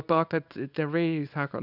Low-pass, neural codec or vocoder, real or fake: 5.4 kHz; codec, 24 kHz, 0.9 kbps, WavTokenizer, small release; fake